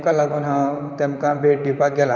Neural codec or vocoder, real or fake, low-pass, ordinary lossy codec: vocoder, 44.1 kHz, 128 mel bands, Pupu-Vocoder; fake; 7.2 kHz; none